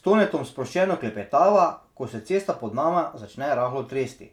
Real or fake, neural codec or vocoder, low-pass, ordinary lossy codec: real; none; 19.8 kHz; none